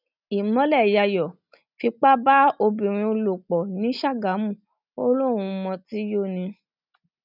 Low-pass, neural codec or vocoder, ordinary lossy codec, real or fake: 5.4 kHz; none; none; real